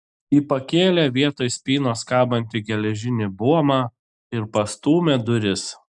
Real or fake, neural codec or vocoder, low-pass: real; none; 10.8 kHz